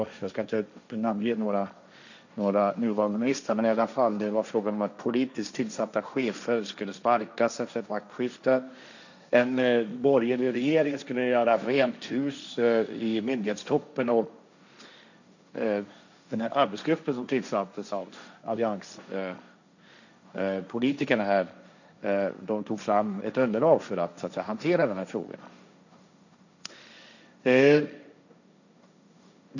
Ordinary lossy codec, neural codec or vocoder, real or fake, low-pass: none; codec, 16 kHz, 1.1 kbps, Voila-Tokenizer; fake; 7.2 kHz